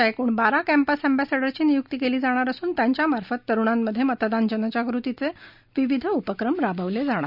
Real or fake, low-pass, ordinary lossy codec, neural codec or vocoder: real; 5.4 kHz; none; none